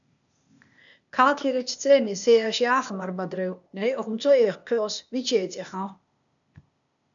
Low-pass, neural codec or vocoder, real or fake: 7.2 kHz; codec, 16 kHz, 0.8 kbps, ZipCodec; fake